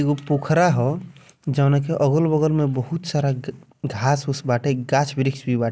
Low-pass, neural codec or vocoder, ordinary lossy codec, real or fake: none; none; none; real